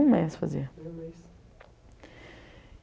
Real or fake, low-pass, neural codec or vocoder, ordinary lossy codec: real; none; none; none